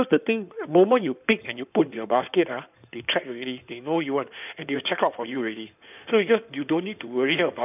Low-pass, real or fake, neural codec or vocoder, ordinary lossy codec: 3.6 kHz; fake; codec, 16 kHz in and 24 kHz out, 2.2 kbps, FireRedTTS-2 codec; none